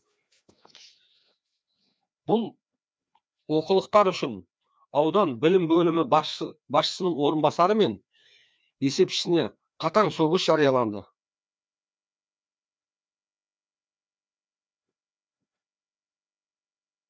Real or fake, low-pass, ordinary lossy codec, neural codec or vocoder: fake; none; none; codec, 16 kHz, 2 kbps, FreqCodec, larger model